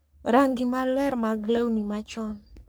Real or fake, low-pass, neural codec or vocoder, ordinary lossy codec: fake; none; codec, 44.1 kHz, 3.4 kbps, Pupu-Codec; none